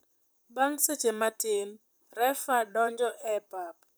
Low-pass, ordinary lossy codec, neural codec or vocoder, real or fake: none; none; vocoder, 44.1 kHz, 128 mel bands, Pupu-Vocoder; fake